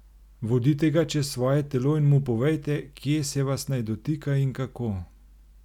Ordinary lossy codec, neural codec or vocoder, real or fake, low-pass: none; none; real; 19.8 kHz